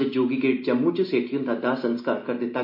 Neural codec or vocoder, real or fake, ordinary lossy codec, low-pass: none; real; MP3, 32 kbps; 5.4 kHz